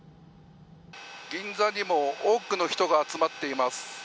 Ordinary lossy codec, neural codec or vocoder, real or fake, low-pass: none; none; real; none